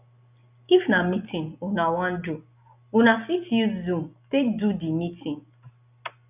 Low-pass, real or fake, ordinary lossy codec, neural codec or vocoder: 3.6 kHz; real; none; none